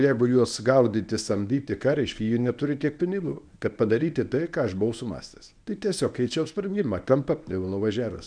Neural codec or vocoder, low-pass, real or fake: codec, 24 kHz, 0.9 kbps, WavTokenizer, medium speech release version 1; 9.9 kHz; fake